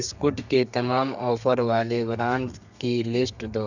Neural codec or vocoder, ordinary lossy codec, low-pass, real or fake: codec, 32 kHz, 1.9 kbps, SNAC; none; 7.2 kHz; fake